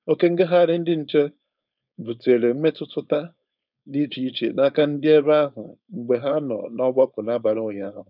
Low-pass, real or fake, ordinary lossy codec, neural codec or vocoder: 5.4 kHz; fake; none; codec, 16 kHz, 4.8 kbps, FACodec